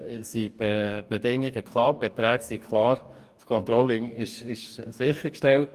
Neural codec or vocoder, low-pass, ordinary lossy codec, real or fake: codec, 44.1 kHz, 2.6 kbps, DAC; 14.4 kHz; Opus, 32 kbps; fake